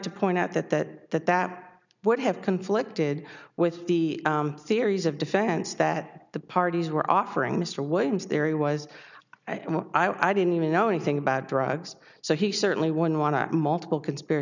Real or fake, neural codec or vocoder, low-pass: real; none; 7.2 kHz